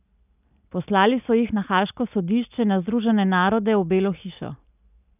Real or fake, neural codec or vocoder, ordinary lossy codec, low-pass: real; none; none; 3.6 kHz